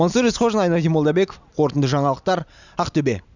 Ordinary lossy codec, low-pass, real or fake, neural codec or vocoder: none; 7.2 kHz; real; none